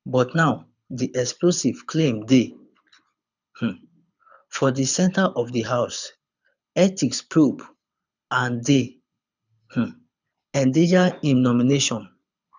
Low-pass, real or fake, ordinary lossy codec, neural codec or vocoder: 7.2 kHz; fake; none; codec, 24 kHz, 6 kbps, HILCodec